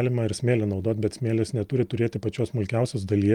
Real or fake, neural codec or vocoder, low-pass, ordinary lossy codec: real; none; 19.8 kHz; Opus, 32 kbps